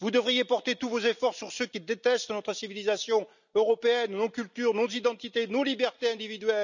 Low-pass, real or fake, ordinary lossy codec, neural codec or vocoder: 7.2 kHz; real; none; none